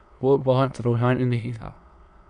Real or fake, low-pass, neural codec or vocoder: fake; 9.9 kHz; autoencoder, 22.05 kHz, a latent of 192 numbers a frame, VITS, trained on many speakers